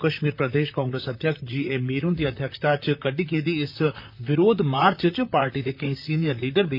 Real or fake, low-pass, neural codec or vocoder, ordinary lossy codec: fake; 5.4 kHz; vocoder, 44.1 kHz, 128 mel bands, Pupu-Vocoder; none